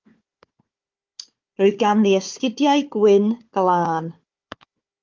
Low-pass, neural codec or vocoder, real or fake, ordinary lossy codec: 7.2 kHz; codec, 16 kHz, 4 kbps, FunCodec, trained on Chinese and English, 50 frames a second; fake; Opus, 32 kbps